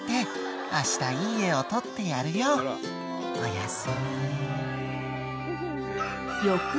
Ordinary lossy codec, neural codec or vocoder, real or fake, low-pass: none; none; real; none